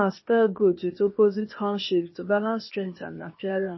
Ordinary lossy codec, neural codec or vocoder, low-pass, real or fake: MP3, 24 kbps; codec, 16 kHz, 0.8 kbps, ZipCodec; 7.2 kHz; fake